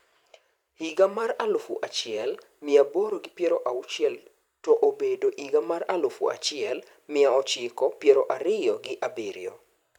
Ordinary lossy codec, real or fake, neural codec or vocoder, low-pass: none; fake; vocoder, 44.1 kHz, 128 mel bands every 512 samples, BigVGAN v2; 19.8 kHz